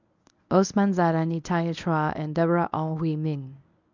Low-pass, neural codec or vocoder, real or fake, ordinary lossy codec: 7.2 kHz; codec, 24 kHz, 0.9 kbps, WavTokenizer, medium speech release version 1; fake; none